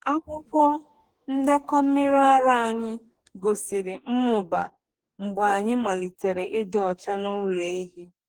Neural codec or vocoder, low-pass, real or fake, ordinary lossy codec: codec, 44.1 kHz, 2.6 kbps, DAC; 19.8 kHz; fake; Opus, 24 kbps